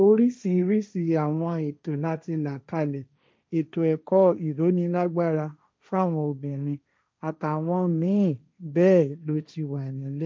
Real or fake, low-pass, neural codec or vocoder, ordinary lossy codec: fake; none; codec, 16 kHz, 1.1 kbps, Voila-Tokenizer; none